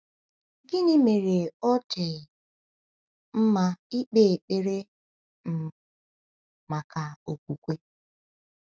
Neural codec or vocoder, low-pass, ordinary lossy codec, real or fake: none; none; none; real